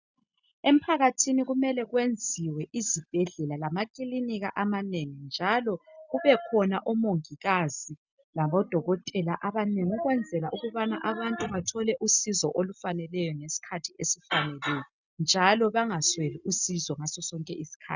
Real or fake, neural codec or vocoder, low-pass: real; none; 7.2 kHz